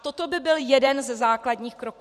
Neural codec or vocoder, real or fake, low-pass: vocoder, 44.1 kHz, 128 mel bands every 512 samples, BigVGAN v2; fake; 14.4 kHz